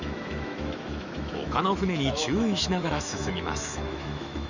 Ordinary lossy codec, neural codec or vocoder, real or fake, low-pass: none; autoencoder, 48 kHz, 128 numbers a frame, DAC-VAE, trained on Japanese speech; fake; 7.2 kHz